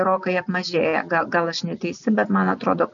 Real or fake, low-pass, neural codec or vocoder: real; 7.2 kHz; none